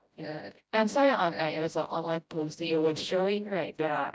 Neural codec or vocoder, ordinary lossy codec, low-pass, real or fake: codec, 16 kHz, 0.5 kbps, FreqCodec, smaller model; none; none; fake